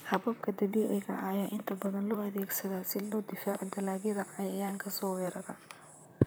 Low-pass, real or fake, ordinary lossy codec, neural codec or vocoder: none; fake; none; vocoder, 44.1 kHz, 128 mel bands, Pupu-Vocoder